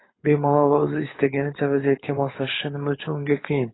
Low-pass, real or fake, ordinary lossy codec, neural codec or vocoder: 7.2 kHz; fake; AAC, 16 kbps; codec, 24 kHz, 6 kbps, HILCodec